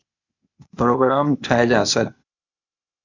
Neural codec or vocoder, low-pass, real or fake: codec, 16 kHz, 0.8 kbps, ZipCodec; 7.2 kHz; fake